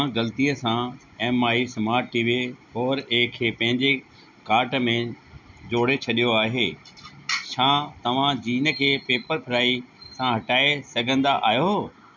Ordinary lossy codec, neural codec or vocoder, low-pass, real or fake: none; none; 7.2 kHz; real